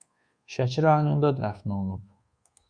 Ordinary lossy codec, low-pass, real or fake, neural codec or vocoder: Opus, 64 kbps; 9.9 kHz; fake; codec, 24 kHz, 1.2 kbps, DualCodec